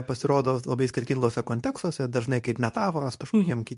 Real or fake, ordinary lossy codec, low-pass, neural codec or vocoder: fake; MP3, 64 kbps; 10.8 kHz; codec, 24 kHz, 0.9 kbps, WavTokenizer, medium speech release version 2